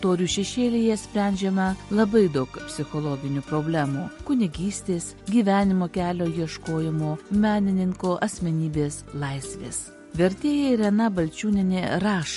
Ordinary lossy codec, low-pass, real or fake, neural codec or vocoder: MP3, 48 kbps; 14.4 kHz; real; none